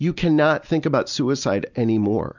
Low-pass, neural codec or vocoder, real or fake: 7.2 kHz; none; real